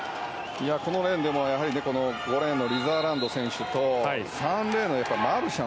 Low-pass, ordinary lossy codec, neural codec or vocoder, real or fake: none; none; none; real